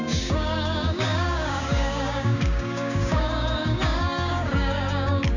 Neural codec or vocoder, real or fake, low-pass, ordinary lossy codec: codec, 16 kHz, 6 kbps, DAC; fake; 7.2 kHz; none